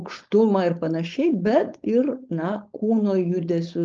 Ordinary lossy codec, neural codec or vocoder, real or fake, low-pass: Opus, 24 kbps; codec, 16 kHz, 4.8 kbps, FACodec; fake; 7.2 kHz